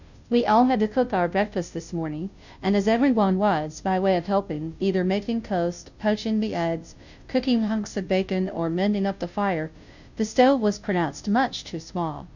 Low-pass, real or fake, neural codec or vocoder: 7.2 kHz; fake; codec, 16 kHz, 0.5 kbps, FunCodec, trained on Chinese and English, 25 frames a second